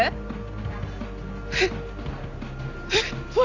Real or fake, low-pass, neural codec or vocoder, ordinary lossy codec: real; 7.2 kHz; none; none